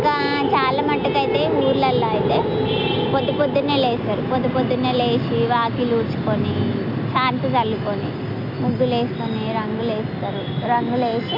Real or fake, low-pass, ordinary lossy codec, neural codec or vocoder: real; 5.4 kHz; MP3, 48 kbps; none